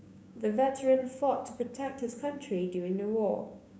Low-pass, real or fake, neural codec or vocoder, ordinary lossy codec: none; fake; codec, 16 kHz, 6 kbps, DAC; none